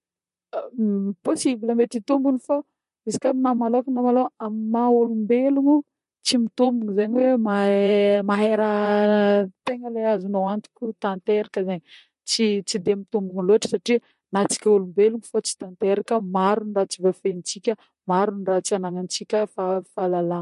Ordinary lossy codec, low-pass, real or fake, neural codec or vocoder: MP3, 48 kbps; 14.4 kHz; fake; vocoder, 44.1 kHz, 128 mel bands, Pupu-Vocoder